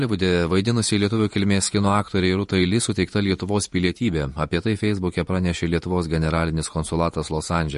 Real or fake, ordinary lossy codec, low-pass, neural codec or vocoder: fake; MP3, 48 kbps; 14.4 kHz; vocoder, 48 kHz, 128 mel bands, Vocos